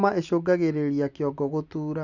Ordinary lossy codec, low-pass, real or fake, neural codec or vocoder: none; 7.2 kHz; real; none